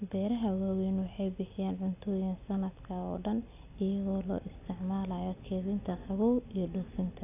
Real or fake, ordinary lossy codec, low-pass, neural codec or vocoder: real; AAC, 24 kbps; 3.6 kHz; none